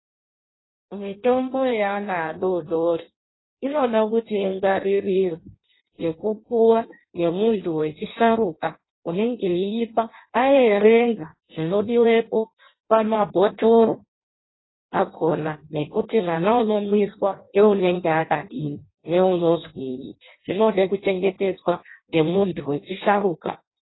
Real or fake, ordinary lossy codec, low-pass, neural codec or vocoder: fake; AAC, 16 kbps; 7.2 kHz; codec, 16 kHz in and 24 kHz out, 0.6 kbps, FireRedTTS-2 codec